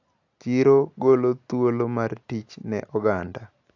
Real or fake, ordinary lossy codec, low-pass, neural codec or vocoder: real; AAC, 48 kbps; 7.2 kHz; none